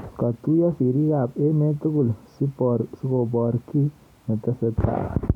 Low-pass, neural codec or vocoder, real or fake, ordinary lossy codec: 19.8 kHz; none; real; MP3, 96 kbps